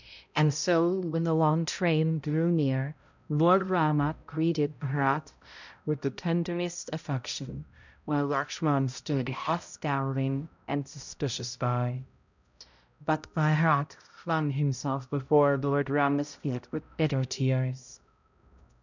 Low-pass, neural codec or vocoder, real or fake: 7.2 kHz; codec, 16 kHz, 0.5 kbps, X-Codec, HuBERT features, trained on balanced general audio; fake